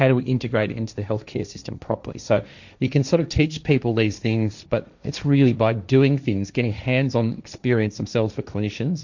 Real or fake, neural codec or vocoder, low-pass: fake; codec, 16 kHz, 1.1 kbps, Voila-Tokenizer; 7.2 kHz